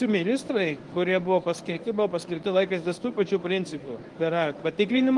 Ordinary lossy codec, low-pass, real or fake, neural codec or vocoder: Opus, 24 kbps; 10.8 kHz; fake; codec, 24 kHz, 0.9 kbps, WavTokenizer, medium speech release version 1